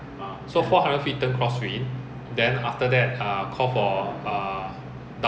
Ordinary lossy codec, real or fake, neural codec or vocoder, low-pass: none; real; none; none